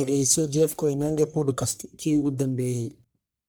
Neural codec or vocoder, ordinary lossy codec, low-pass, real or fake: codec, 44.1 kHz, 1.7 kbps, Pupu-Codec; none; none; fake